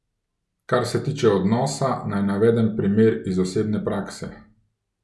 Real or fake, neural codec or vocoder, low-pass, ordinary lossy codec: real; none; none; none